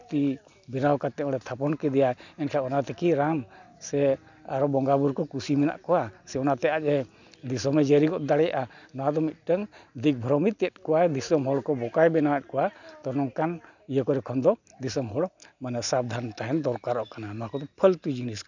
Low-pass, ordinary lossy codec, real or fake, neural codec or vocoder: 7.2 kHz; none; real; none